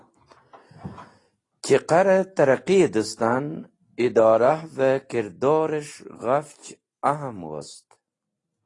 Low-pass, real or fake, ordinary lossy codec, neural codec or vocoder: 10.8 kHz; real; AAC, 32 kbps; none